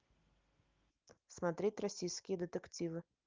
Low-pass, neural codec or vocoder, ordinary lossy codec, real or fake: 7.2 kHz; none; Opus, 24 kbps; real